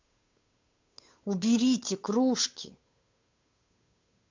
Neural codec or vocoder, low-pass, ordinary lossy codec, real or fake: codec, 16 kHz, 8 kbps, FunCodec, trained on Chinese and English, 25 frames a second; 7.2 kHz; MP3, 48 kbps; fake